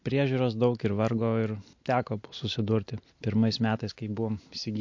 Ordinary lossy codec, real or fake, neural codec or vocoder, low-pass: MP3, 48 kbps; real; none; 7.2 kHz